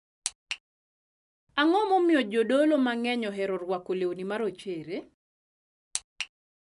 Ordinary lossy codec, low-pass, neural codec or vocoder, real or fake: none; 10.8 kHz; none; real